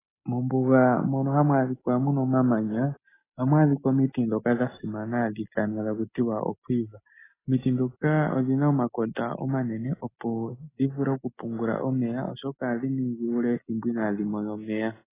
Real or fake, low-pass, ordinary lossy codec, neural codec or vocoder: real; 3.6 kHz; AAC, 16 kbps; none